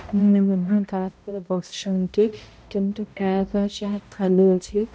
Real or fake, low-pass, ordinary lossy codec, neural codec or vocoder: fake; none; none; codec, 16 kHz, 0.5 kbps, X-Codec, HuBERT features, trained on balanced general audio